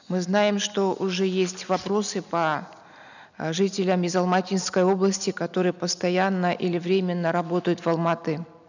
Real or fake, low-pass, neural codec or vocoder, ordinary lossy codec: real; 7.2 kHz; none; none